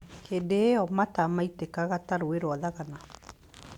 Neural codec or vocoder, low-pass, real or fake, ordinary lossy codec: none; 19.8 kHz; real; Opus, 64 kbps